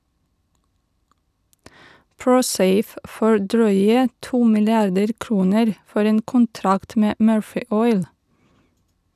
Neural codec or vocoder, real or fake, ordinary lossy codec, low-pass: vocoder, 44.1 kHz, 128 mel bands every 256 samples, BigVGAN v2; fake; none; 14.4 kHz